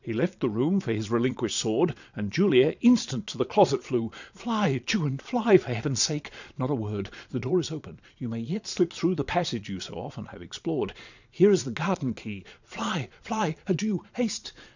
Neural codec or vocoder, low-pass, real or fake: vocoder, 22.05 kHz, 80 mel bands, Vocos; 7.2 kHz; fake